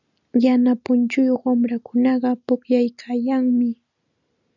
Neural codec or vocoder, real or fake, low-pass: none; real; 7.2 kHz